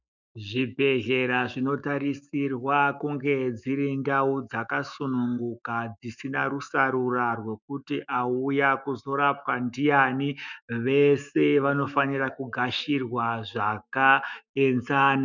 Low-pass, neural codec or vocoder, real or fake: 7.2 kHz; autoencoder, 48 kHz, 128 numbers a frame, DAC-VAE, trained on Japanese speech; fake